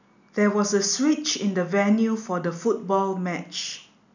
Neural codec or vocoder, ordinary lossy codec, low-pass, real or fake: none; none; 7.2 kHz; real